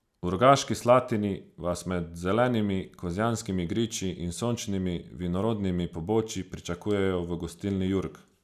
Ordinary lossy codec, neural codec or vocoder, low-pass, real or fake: none; none; 14.4 kHz; real